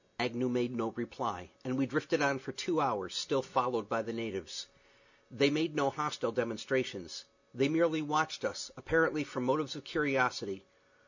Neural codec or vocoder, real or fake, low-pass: none; real; 7.2 kHz